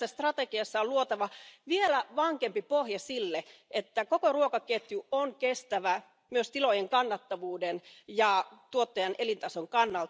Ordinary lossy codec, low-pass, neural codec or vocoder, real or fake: none; none; none; real